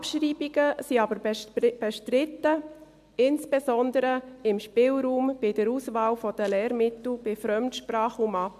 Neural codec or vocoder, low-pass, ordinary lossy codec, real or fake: none; 14.4 kHz; MP3, 96 kbps; real